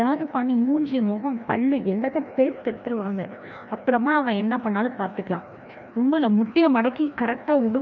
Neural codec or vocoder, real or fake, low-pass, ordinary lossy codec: codec, 16 kHz, 1 kbps, FreqCodec, larger model; fake; 7.2 kHz; none